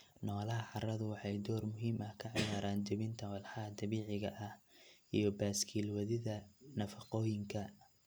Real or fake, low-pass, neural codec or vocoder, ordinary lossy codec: real; none; none; none